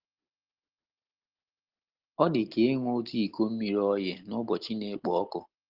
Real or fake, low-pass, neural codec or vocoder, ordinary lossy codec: real; 5.4 kHz; none; Opus, 16 kbps